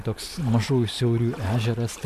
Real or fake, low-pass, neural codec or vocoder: real; 14.4 kHz; none